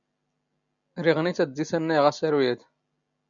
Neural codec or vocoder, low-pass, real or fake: none; 7.2 kHz; real